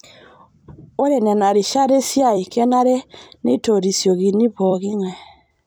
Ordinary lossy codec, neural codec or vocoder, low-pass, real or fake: none; vocoder, 44.1 kHz, 128 mel bands every 256 samples, BigVGAN v2; none; fake